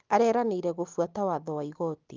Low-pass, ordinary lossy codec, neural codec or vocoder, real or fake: 7.2 kHz; Opus, 24 kbps; none; real